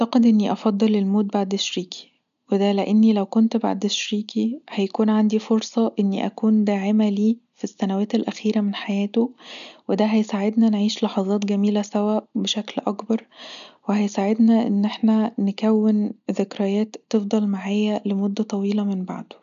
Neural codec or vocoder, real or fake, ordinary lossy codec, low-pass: none; real; none; 7.2 kHz